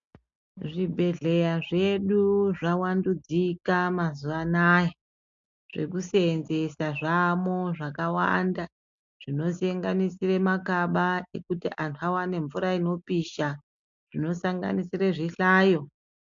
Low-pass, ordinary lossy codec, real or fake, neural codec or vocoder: 7.2 kHz; MP3, 64 kbps; real; none